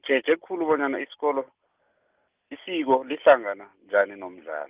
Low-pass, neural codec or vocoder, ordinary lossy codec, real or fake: 3.6 kHz; none; Opus, 32 kbps; real